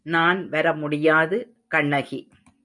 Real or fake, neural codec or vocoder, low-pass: real; none; 10.8 kHz